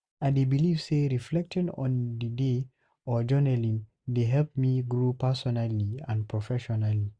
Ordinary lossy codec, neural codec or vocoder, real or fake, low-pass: none; none; real; 9.9 kHz